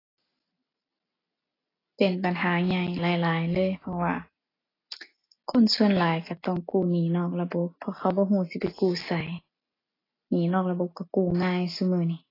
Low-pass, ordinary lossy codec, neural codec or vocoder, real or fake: 5.4 kHz; AAC, 24 kbps; none; real